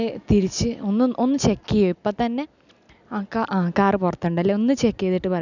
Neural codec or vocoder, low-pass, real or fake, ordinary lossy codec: none; 7.2 kHz; real; none